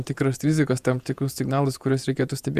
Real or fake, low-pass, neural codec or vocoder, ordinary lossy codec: fake; 14.4 kHz; vocoder, 48 kHz, 128 mel bands, Vocos; Opus, 64 kbps